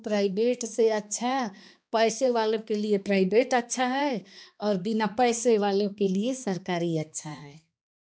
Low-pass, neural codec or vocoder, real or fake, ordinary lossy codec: none; codec, 16 kHz, 2 kbps, X-Codec, HuBERT features, trained on balanced general audio; fake; none